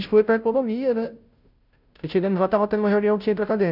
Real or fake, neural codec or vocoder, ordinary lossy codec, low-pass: fake; codec, 16 kHz, 0.5 kbps, FunCodec, trained on Chinese and English, 25 frames a second; none; 5.4 kHz